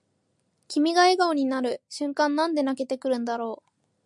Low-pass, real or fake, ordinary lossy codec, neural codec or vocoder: 10.8 kHz; real; MP3, 96 kbps; none